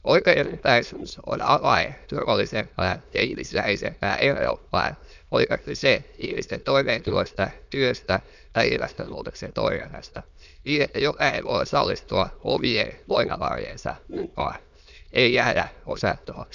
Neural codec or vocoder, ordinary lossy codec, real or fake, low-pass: autoencoder, 22.05 kHz, a latent of 192 numbers a frame, VITS, trained on many speakers; none; fake; 7.2 kHz